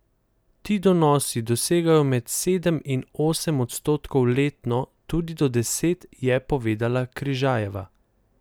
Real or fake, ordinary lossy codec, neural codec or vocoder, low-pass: real; none; none; none